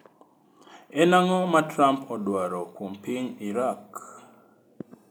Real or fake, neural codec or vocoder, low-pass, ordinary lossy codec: real; none; none; none